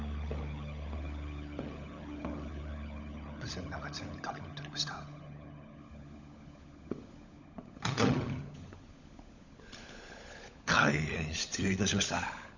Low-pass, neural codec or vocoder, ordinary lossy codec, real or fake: 7.2 kHz; codec, 16 kHz, 16 kbps, FunCodec, trained on LibriTTS, 50 frames a second; none; fake